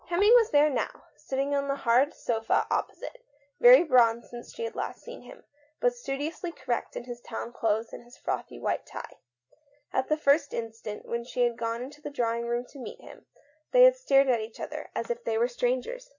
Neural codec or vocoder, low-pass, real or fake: none; 7.2 kHz; real